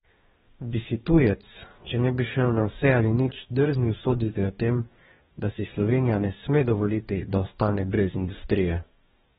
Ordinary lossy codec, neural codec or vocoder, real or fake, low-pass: AAC, 16 kbps; codec, 44.1 kHz, 2.6 kbps, DAC; fake; 19.8 kHz